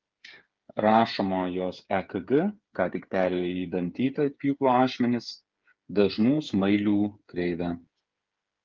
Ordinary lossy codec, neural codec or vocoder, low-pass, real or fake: Opus, 32 kbps; codec, 16 kHz, 8 kbps, FreqCodec, smaller model; 7.2 kHz; fake